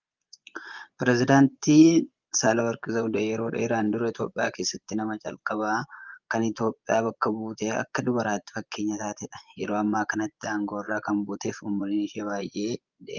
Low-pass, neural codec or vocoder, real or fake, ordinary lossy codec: 7.2 kHz; vocoder, 24 kHz, 100 mel bands, Vocos; fake; Opus, 24 kbps